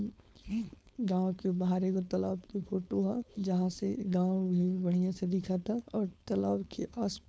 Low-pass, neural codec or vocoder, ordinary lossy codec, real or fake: none; codec, 16 kHz, 4.8 kbps, FACodec; none; fake